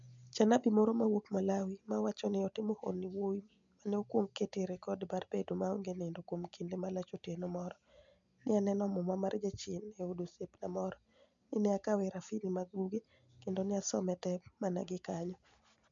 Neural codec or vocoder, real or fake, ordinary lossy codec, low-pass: none; real; MP3, 64 kbps; 7.2 kHz